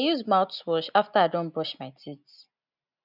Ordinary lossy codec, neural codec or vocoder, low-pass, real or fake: none; none; 5.4 kHz; real